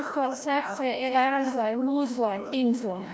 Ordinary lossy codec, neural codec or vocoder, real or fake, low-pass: none; codec, 16 kHz, 0.5 kbps, FreqCodec, larger model; fake; none